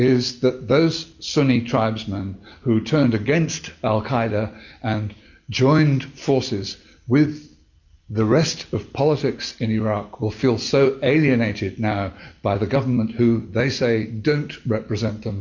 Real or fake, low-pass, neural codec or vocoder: real; 7.2 kHz; none